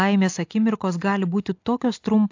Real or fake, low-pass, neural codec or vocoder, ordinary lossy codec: real; 7.2 kHz; none; AAC, 48 kbps